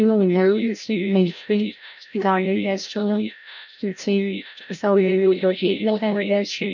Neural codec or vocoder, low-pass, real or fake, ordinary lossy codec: codec, 16 kHz, 0.5 kbps, FreqCodec, larger model; 7.2 kHz; fake; none